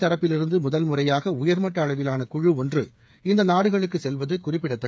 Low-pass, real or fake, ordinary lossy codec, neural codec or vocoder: none; fake; none; codec, 16 kHz, 8 kbps, FreqCodec, smaller model